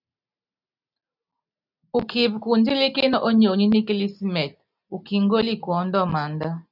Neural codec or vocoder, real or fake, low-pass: none; real; 5.4 kHz